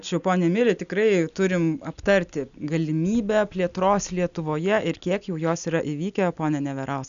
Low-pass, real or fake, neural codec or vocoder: 7.2 kHz; real; none